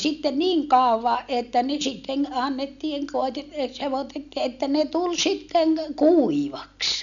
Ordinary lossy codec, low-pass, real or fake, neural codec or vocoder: none; 7.2 kHz; real; none